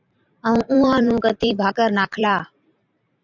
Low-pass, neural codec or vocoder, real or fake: 7.2 kHz; vocoder, 44.1 kHz, 128 mel bands every 512 samples, BigVGAN v2; fake